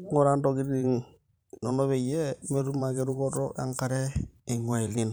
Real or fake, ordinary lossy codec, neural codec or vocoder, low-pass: fake; none; vocoder, 44.1 kHz, 128 mel bands every 512 samples, BigVGAN v2; none